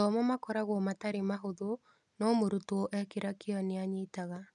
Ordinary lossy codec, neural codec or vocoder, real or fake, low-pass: none; none; real; 10.8 kHz